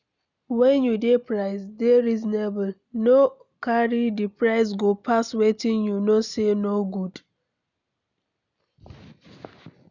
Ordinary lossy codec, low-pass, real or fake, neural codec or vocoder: none; 7.2 kHz; real; none